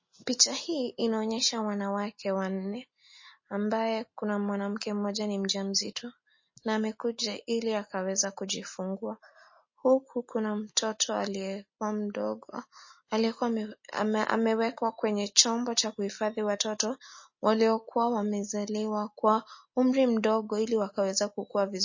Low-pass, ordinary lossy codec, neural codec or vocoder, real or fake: 7.2 kHz; MP3, 32 kbps; none; real